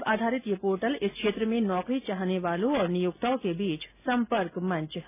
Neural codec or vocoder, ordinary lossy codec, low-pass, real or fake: none; none; 3.6 kHz; real